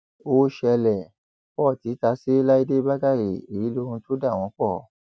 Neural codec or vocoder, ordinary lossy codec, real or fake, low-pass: none; none; real; none